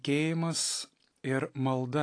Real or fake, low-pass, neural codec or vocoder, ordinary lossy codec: real; 9.9 kHz; none; AAC, 48 kbps